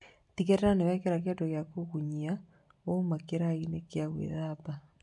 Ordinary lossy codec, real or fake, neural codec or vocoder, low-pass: MP3, 64 kbps; real; none; 10.8 kHz